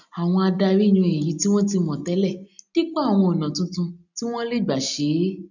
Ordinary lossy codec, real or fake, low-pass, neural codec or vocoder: none; real; 7.2 kHz; none